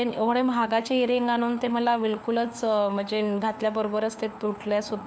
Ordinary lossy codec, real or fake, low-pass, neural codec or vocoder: none; fake; none; codec, 16 kHz, 4 kbps, FunCodec, trained on LibriTTS, 50 frames a second